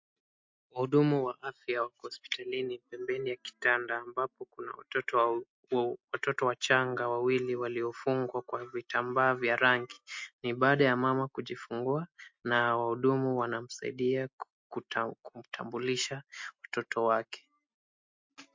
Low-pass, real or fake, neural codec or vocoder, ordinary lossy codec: 7.2 kHz; real; none; MP3, 48 kbps